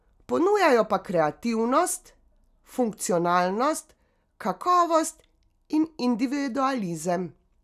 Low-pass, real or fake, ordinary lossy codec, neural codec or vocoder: 14.4 kHz; real; none; none